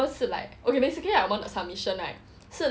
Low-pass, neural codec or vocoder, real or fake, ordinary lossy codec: none; none; real; none